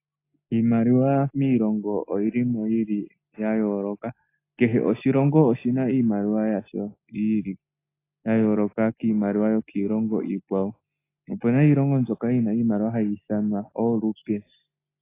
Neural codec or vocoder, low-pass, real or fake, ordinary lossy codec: none; 3.6 kHz; real; AAC, 24 kbps